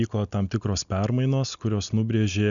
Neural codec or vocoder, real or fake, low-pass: none; real; 7.2 kHz